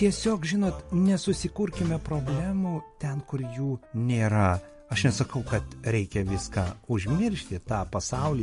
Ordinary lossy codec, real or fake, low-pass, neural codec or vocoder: MP3, 48 kbps; real; 10.8 kHz; none